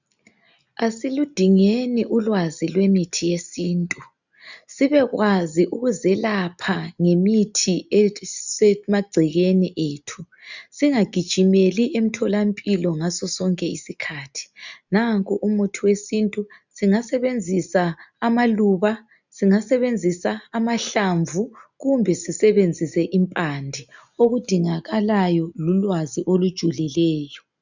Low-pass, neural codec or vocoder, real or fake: 7.2 kHz; none; real